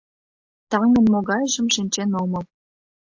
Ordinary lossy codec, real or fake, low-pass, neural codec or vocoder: AAC, 48 kbps; real; 7.2 kHz; none